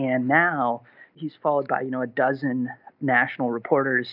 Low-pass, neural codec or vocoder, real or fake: 5.4 kHz; none; real